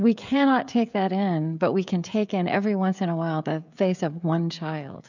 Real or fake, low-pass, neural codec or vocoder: fake; 7.2 kHz; codec, 16 kHz, 16 kbps, FreqCodec, smaller model